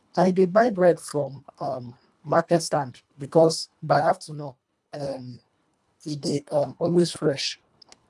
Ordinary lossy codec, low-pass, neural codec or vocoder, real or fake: none; none; codec, 24 kHz, 1.5 kbps, HILCodec; fake